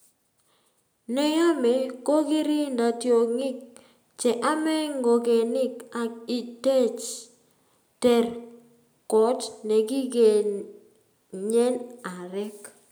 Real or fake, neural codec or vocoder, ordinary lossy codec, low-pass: real; none; none; none